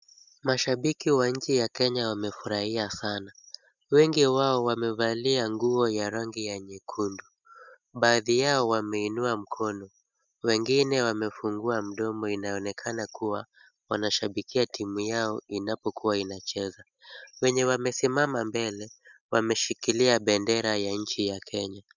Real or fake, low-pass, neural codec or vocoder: real; 7.2 kHz; none